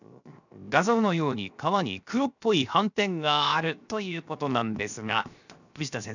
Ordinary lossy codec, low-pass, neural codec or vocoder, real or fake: none; 7.2 kHz; codec, 16 kHz, 0.7 kbps, FocalCodec; fake